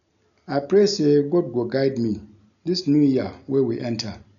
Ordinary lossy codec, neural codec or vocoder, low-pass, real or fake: none; none; 7.2 kHz; real